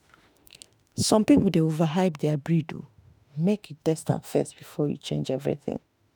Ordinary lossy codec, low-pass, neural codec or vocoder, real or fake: none; none; autoencoder, 48 kHz, 32 numbers a frame, DAC-VAE, trained on Japanese speech; fake